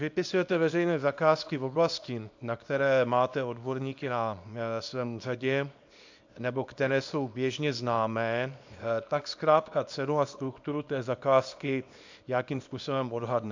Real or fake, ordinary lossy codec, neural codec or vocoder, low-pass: fake; AAC, 48 kbps; codec, 24 kHz, 0.9 kbps, WavTokenizer, small release; 7.2 kHz